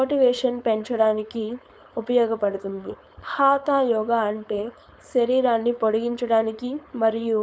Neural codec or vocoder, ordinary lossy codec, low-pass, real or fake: codec, 16 kHz, 4.8 kbps, FACodec; none; none; fake